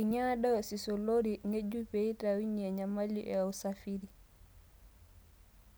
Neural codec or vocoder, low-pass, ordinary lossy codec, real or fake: none; none; none; real